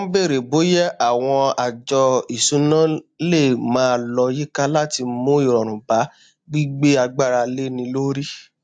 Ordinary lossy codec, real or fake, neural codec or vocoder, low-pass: AAC, 64 kbps; real; none; 9.9 kHz